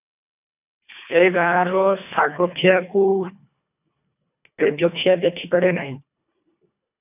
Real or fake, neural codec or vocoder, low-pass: fake; codec, 24 kHz, 1.5 kbps, HILCodec; 3.6 kHz